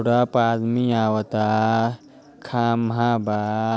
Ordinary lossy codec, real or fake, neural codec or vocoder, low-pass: none; real; none; none